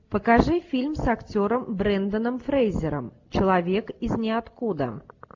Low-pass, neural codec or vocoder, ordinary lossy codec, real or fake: 7.2 kHz; none; AAC, 48 kbps; real